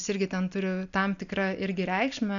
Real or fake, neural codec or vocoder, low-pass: real; none; 7.2 kHz